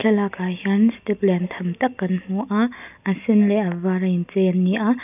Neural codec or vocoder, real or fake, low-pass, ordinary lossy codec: none; real; 3.6 kHz; none